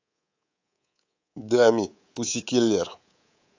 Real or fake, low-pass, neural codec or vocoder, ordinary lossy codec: fake; 7.2 kHz; codec, 24 kHz, 3.1 kbps, DualCodec; AAC, 48 kbps